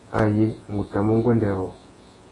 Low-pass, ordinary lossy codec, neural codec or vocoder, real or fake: 10.8 kHz; AAC, 32 kbps; vocoder, 48 kHz, 128 mel bands, Vocos; fake